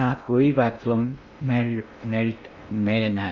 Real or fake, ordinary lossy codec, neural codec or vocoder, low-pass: fake; none; codec, 16 kHz in and 24 kHz out, 0.6 kbps, FocalCodec, streaming, 2048 codes; 7.2 kHz